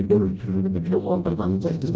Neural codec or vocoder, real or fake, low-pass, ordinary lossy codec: codec, 16 kHz, 0.5 kbps, FreqCodec, smaller model; fake; none; none